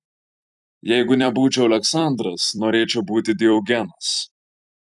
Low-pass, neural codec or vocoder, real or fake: 10.8 kHz; vocoder, 44.1 kHz, 128 mel bands every 256 samples, BigVGAN v2; fake